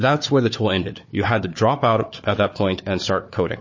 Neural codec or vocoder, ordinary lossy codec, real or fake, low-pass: codec, 16 kHz, 4 kbps, FunCodec, trained on Chinese and English, 50 frames a second; MP3, 32 kbps; fake; 7.2 kHz